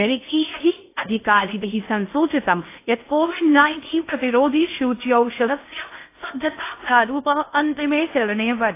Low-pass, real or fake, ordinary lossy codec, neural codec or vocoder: 3.6 kHz; fake; AAC, 24 kbps; codec, 16 kHz in and 24 kHz out, 0.6 kbps, FocalCodec, streaming, 4096 codes